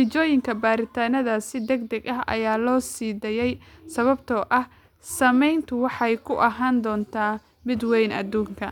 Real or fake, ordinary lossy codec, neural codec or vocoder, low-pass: real; none; none; 19.8 kHz